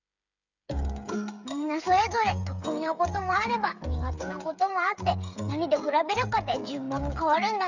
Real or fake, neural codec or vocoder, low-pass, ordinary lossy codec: fake; codec, 16 kHz, 8 kbps, FreqCodec, smaller model; 7.2 kHz; none